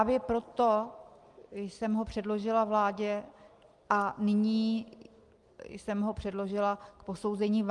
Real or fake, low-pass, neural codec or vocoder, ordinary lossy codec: real; 10.8 kHz; none; Opus, 24 kbps